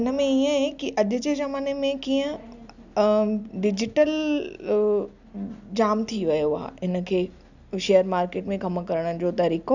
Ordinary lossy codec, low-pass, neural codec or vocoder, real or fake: none; 7.2 kHz; none; real